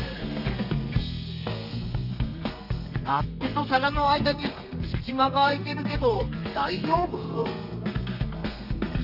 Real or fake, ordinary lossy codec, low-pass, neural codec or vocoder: fake; none; 5.4 kHz; codec, 32 kHz, 1.9 kbps, SNAC